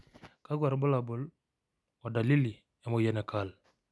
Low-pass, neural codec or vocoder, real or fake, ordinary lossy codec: none; none; real; none